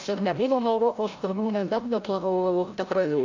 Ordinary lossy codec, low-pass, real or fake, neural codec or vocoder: AAC, 48 kbps; 7.2 kHz; fake; codec, 16 kHz, 0.5 kbps, FreqCodec, larger model